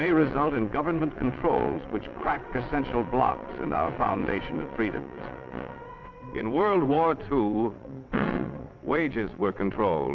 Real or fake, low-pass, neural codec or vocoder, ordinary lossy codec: fake; 7.2 kHz; vocoder, 22.05 kHz, 80 mel bands, WaveNeXt; Opus, 64 kbps